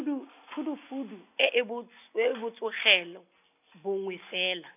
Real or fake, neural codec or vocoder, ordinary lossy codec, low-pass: real; none; none; 3.6 kHz